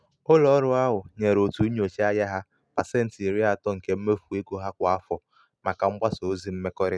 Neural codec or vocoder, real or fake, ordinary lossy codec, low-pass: none; real; none; none